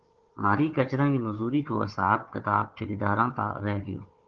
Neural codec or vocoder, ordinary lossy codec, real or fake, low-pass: codec, 16 kHz, 4 kbps, FunCodec, trained on Chinese and English, 50 frames a second; Opus, 16 kbps; fake; 7.2 kHz